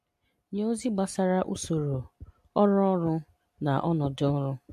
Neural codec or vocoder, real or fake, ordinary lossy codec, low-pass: none; real; MP3, 64 kbps; 14.4 kHz